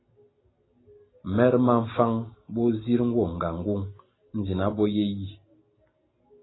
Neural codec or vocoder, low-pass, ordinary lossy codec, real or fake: none; 7.2 kHz; AAC, 16 kbps; real